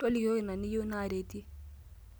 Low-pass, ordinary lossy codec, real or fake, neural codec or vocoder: none; none; real; none